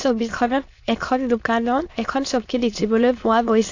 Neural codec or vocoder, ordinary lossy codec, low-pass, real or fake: autoencoder, 22.05 kHz, a latent of 192 numbers a frame, VITS, trained on many speakers; AAC, 48 kbps; 7.2 kHz; fake